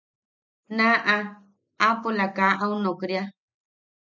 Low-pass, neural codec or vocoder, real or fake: 7.2 kHz; none; real